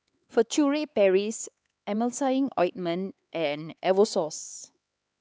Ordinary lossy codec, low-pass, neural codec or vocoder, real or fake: none; none; codec, 16 kHz, 4 kbps, X-Codec, HuBERT features, trained on LibriSpeech; fake